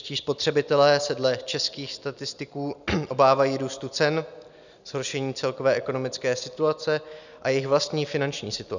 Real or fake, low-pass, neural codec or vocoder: real; 7.2 kHz; none